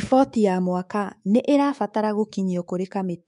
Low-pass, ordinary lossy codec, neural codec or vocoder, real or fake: 10.8 kHz; MP3, 64 kbps; codec, 24 kHz, 3.1 kbps, DualCodec; fake